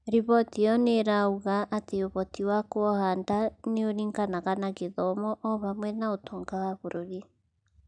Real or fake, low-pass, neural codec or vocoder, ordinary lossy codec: real; 9.9 kHz; none; none